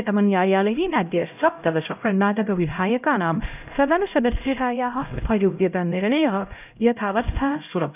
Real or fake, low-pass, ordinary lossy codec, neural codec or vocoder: fake; 3.6 kHz; none; codec, 16 kHz, 0.5 kbps, X-Codec, HuBERT features, trained on LibriSpeech